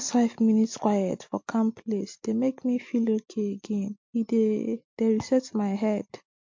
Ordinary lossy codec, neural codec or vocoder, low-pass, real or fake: MP3, 48 kbps; none; 7.2 kHz; real